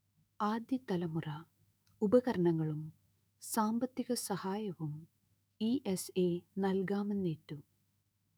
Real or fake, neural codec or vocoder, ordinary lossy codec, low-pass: fake; autoencoder, 48 kHz, 128 numbers a frame, DAC-VAE, trained on Japanese speech; none; none